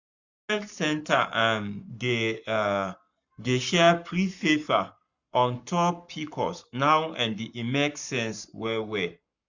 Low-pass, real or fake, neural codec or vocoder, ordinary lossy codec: 7.2 kHz; fake; codec, 44.1 kHz, 7.8 kbps, Pupu-Codec; none